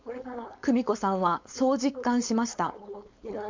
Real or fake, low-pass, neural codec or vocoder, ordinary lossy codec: fake; 7.2 kHz; codec, 16 kHz, 4.8 kbps, FACodec; MP3, 64 kbps